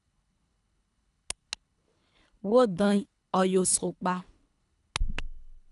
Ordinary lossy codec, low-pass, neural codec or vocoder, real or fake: none; 10.8 kHz; codec, 24 kHz, 3 kbps, HILCodec; fake